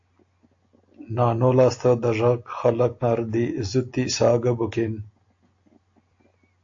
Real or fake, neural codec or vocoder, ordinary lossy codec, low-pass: real; none; AAC, 48 kbps; 7.2 kHz